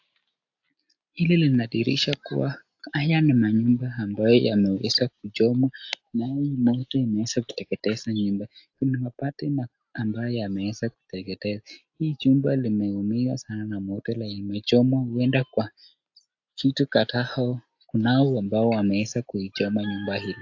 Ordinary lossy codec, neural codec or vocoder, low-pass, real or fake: AAC, 48 kbps; none; 7.2 kHz; real